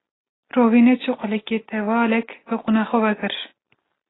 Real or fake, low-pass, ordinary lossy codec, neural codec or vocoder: real; 7.2 kHz; AAC, 16 kbps; none